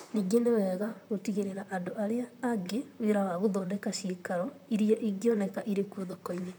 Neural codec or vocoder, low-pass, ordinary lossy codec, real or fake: vocoder, 44.1 kHz, 128 mel bands, Pupu-Vocoder; none; none; fake